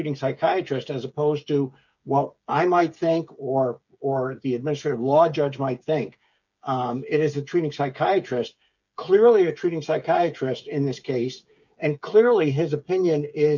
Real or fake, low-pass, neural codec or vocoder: fake; 7.2 kHz; codec, 16 kHz, 8 kbps, FreqCodec, smaller model